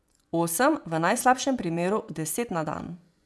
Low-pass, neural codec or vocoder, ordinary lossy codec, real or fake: none; vocoder, 24 kHz, 100 mel bands, Vocos; none; fake